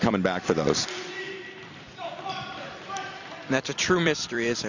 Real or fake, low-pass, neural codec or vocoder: fake; 7.2 kHz; vocoder, 44.1 kHz, 128 mel bands every 256 samples, BigVGAN v2